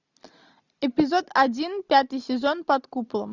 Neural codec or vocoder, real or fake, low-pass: none; real; 7.2 kHz